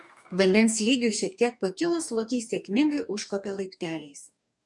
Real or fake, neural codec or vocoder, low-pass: fake; codec, 44.1 kHz, 2.6 kbps, DAC; 10.8 kHz